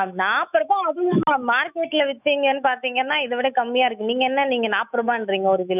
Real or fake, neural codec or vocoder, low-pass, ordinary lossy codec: fake; autoencoder, 48 kHz, 128 numbers a frame, DAC-VAE, trained on Japanese speech; 3.6 kHz; none